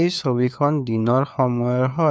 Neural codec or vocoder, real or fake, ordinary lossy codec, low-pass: codec, 16 kHz, 4 kbps, FunCodec, trained on LibriTTS, 50 frames a second; fake; none; none